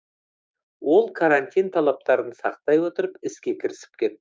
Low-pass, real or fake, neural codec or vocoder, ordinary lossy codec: none; fake; codec, 16 kHz, 6 kbps, DAC; none